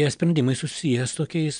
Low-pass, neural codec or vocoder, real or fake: 9.9 kHz; none; real